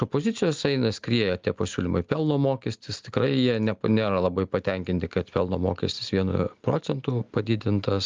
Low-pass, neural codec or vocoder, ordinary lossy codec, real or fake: 7.2 kHz; none; Opus, 24 kbps; real